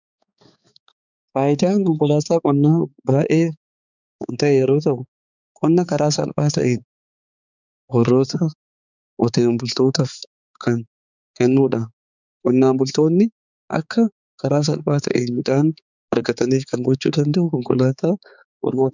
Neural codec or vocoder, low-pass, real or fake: codec, 16 kHz, 4 kbps, X-Codec, HuBERT features, trained on balanced general audio; 7.2 kHz; fake